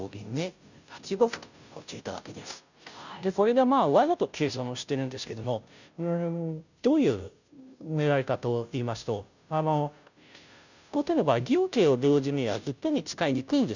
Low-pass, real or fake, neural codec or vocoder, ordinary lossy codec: 7.2 kHz; fake; codec, 16 kHz, 0.5 kbps, FunCodec, trained on Chinese and English, 25 frames a second; none